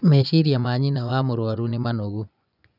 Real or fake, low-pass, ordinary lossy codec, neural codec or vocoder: fake; 5.4 kHz; none; vocoder, 24 kHz, 100 mel bands, Vocos